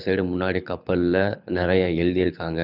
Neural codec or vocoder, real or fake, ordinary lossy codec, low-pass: codec, 24 kHz, 6 kbps, HILCodec; fake; none; 5.4 kHz